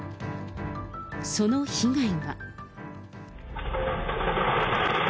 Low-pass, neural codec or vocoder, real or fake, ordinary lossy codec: none; none; real; none